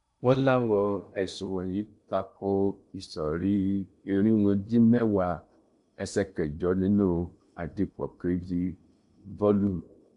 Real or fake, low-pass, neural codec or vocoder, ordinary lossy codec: fake; 10.8 kHz; codec, 16 kHz in and 24 kHz out, 0.8 kbps, FocalCodec, streaming, 65536 codes; none